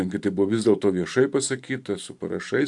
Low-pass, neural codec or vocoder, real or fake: 10.8 kHz; vocoder, 44.1 kHz, 128 mel bands every 512 samples, BigVGAN v2; fake